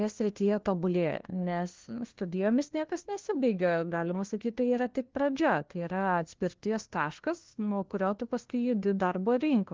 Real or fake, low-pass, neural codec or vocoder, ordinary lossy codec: fake; 7.2 kHz; codec, 16 kHz, 1 kbps, FunCodec, trained on LibriTTS, 50 frames a second; Opus, 16 kbps